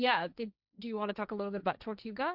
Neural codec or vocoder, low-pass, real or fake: codec, 16 kHz, 1.1 kbps, Voila-Tokenizer; 5.4 kHz; fake